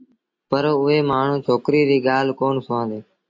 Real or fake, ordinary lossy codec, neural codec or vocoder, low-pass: real; AAC, 48 kbps; none; 7.2 kHz